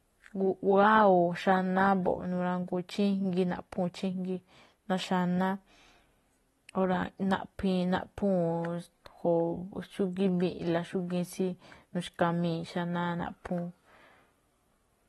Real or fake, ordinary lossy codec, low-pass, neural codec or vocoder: real; AAC, 32 kbps; 19.8 kHz; none